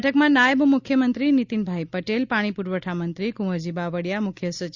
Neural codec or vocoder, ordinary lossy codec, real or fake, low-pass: none; Opus, 64 kbps; real; 7.2 kHz